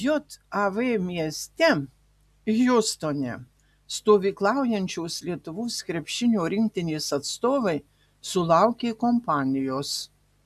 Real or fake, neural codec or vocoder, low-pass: real; none; 14.4 kHz